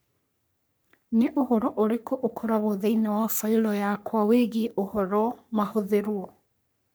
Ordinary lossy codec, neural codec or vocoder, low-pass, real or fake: none; codec, 44.1 kHz, 3.4 kbps, Pupu-Codec; none; fake